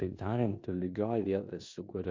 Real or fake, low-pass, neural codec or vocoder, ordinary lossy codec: fake; 7.2 kHz; codec, 16 kHz in and 24 kHz out, 0.9 kbps, LongCat-Audio-Codec, four codebook decoder; MP3, 48 kbps